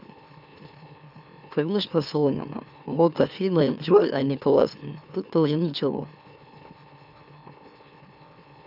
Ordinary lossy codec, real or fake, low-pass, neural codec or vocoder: none; fake; 5.4 kHz; autoencoder, 44.1 kHz, a latent of 192 numbers a frame, MeloTTS